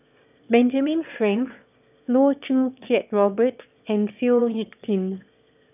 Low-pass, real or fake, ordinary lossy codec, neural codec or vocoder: 3.6 kHz; fake; none; autoencoder, 22.05 kHz, a latent of 192 numbers a frame, VITS, trained on one speaker